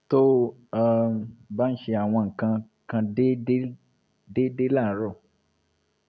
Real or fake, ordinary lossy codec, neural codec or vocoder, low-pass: real; none; none; none